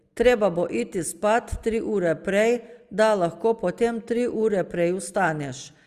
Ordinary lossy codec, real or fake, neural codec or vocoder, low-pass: Opus, 32 kbps; real; none; 14.4 kHz